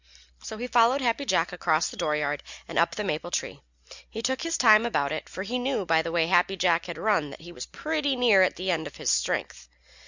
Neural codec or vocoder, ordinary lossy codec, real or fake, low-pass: none; Opus, 64 kbps; real; 7.2 kHz